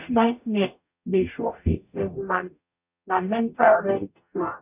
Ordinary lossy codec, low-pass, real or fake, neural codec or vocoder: none; 3.6 kHz; fake; codec, 44.1 kHz, 0.9 kbps, DAC